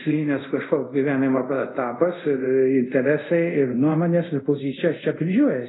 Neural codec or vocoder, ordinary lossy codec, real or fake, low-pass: codec, 24 kHz, 0.5 kbps, DualCodec; AAC, 16 kbps; fake; 7.2 kHz